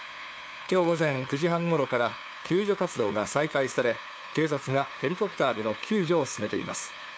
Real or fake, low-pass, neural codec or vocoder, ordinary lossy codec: fake; none; codec, 16 kHz, 2 kbps, FunCodec, trained on LibriTTS, 25 frames a second; none